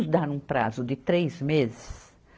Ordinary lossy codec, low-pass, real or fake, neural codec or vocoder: none; none; real; none